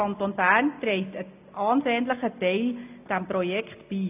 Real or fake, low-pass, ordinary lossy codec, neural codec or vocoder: real; 3.6 kHz; AAC, 32 kbps; none